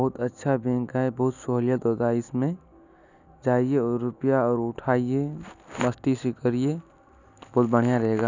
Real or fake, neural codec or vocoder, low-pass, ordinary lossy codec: real; none; 7.2 kHz; none